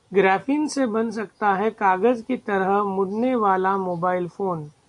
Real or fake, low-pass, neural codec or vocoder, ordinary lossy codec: real; 10.8 kHz; none; AAC, 64 kbps